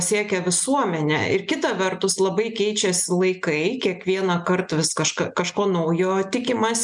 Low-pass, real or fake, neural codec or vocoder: 10.8 kHz; real; none